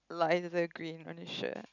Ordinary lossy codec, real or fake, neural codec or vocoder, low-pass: none; real; none; 7.2 kHz